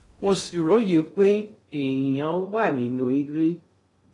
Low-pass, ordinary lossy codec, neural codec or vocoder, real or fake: 10.8 kHz; AAC, 32 kbps; codec, 16 kHz in and 24 kHz out, 0.6 kbps, FocalCodec, streaming, 2048 codes; fake